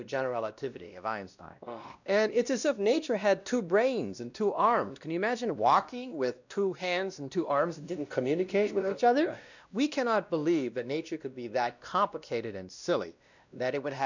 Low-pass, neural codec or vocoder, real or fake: 7.2 kHz; codec, 16 kHz, 1 kbps, X-Codec, WavLM features, trained on Multilingual LibriSpeech; fake